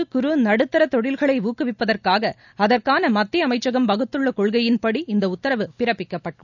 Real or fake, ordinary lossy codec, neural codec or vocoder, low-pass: real; none; none; 7.2 kHz